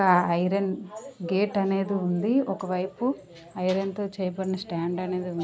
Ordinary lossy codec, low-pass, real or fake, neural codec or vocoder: none; none; real; none